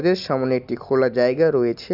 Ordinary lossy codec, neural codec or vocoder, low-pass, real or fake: none; none; 5.4 kHz; real